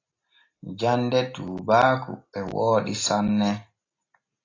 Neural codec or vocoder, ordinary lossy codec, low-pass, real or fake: none; AAC, 32 kbps; 7.2 kHz; real